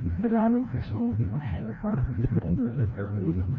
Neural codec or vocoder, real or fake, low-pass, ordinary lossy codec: codec, 16 kHz, 0.5 kbps, FreqCodec, larger model; fake; 7.2 kHz; none